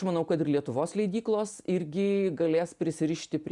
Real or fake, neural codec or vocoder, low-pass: real; none; 10.8 kHz